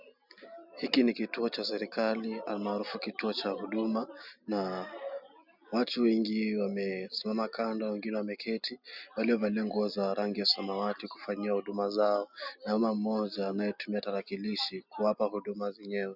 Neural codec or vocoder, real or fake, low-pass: none; real; 5.4 kHz